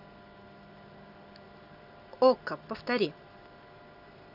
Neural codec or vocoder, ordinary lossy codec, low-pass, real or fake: none; none; 5.4 kHz; real